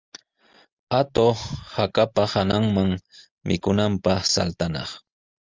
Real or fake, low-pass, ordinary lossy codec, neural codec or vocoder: real; 7.2 kHz; Opus, 32 kbps; none